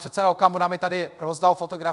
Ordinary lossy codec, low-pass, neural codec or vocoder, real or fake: AAC, 96 kbps; 10.8 kHz; codec, 24 kHz, 0.5 kbps, DualCodec; fake